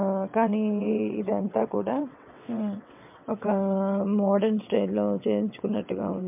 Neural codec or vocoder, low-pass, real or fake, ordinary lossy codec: vocoder, 22.05 kHz, 80 mel bands, WaveNeXt; 3.6 kHz; fake; MP3, 32 kbps